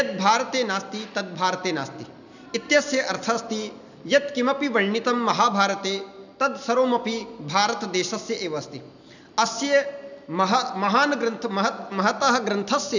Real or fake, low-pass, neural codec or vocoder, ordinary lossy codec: real; 7.2 kHz; none; none